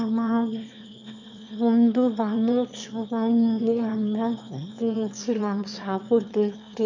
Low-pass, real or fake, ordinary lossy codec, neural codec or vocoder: 7.2 kHz; fake; none; autoencoder, 22.05 kHz, a latent of 192 numbers a frame, VITS, trained on one speaker